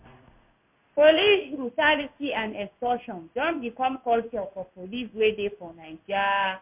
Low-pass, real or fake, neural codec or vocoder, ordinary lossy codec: 3.6 kHz; fake; codec, 16 kHz in and 24 kHz out, 1 kbps, XY-Tokenizer; MP3, 32 kbps